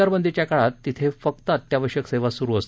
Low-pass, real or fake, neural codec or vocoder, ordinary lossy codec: none; real; none; none